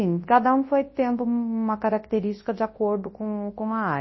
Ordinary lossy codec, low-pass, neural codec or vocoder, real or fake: MP3, 24 kbps; 7.2 kHz; codec, 24 kHz, 0.9 kbps, WavTokenizer, large speech release; fake